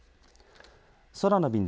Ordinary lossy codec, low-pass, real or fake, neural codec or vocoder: none; none; real; none